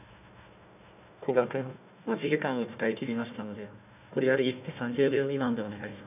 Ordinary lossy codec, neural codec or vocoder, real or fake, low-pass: none; codec, 16 kHz, 1 kbps, FunCodec, trained on Chinese and English, 50 frames a second; fake; 3.6 kHz